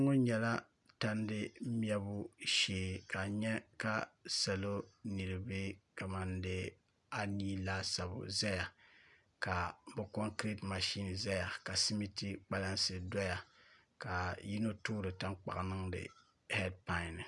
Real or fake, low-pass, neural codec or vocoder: real; 10.8 kHz; none